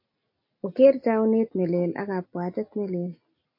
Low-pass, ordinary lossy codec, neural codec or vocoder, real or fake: 5.4 kHz; MP3, 32 kbps; none; real